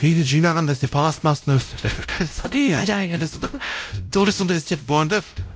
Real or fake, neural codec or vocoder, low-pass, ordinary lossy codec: fake; codec, 16 kHz, 0.5 kbps, X-Codec, WavLM features, trained on Multilingual LibriSpeech; none; none